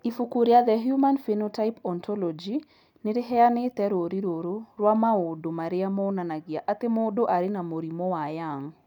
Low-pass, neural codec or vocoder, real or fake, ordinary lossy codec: 19.8 kHz; none; real; none